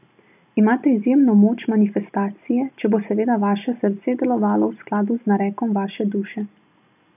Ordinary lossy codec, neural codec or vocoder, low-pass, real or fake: none; none; 3.6 kHz; real